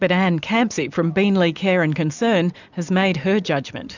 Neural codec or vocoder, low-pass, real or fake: none; 7.2 kHz; real